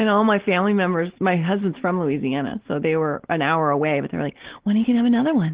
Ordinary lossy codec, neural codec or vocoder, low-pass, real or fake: Opus, 16 kbps; none; 3.6 kHz; real